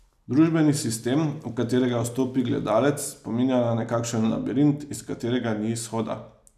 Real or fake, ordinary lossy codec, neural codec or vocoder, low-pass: real; none; none; 14.4 kHz